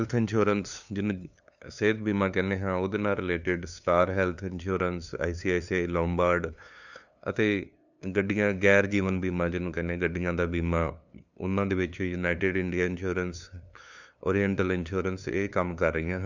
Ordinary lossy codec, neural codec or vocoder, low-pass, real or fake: none; codec, 16 kHz, 2 kbps, FunCodec, trained on LibriTTS, 25 frames a second; 7.2 kHz; fake